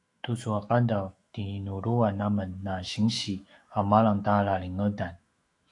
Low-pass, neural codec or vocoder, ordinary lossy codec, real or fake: 10.8 kHz; autoencoder, 48 kHz, 128 numbers a frame, DAC-VAE, trained on Japanese speech; AAC, 48 kbps; fake